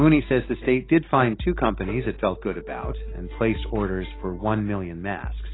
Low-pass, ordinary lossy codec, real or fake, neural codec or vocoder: 7.2 kHz; AAC, 16 kbps; real; none